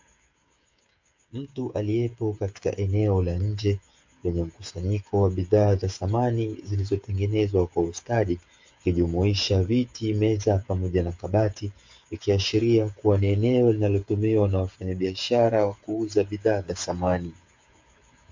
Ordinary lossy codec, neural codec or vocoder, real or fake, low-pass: MP3, 48 kbps; codec, 16 kHz, 8 kbps, FreqCodec, smaller model; fake; 7.2 kHz